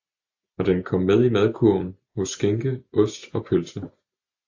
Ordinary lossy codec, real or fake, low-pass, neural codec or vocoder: AAC, 48 kbps; real; 7.2 kHz; none